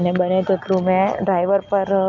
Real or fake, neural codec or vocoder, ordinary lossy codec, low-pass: real; none; none; 7.2 kHz